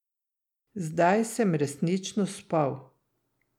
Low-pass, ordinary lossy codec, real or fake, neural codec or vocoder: 19.8 kHz; none; real; none